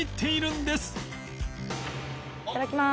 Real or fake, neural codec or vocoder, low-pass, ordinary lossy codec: real; none; none; none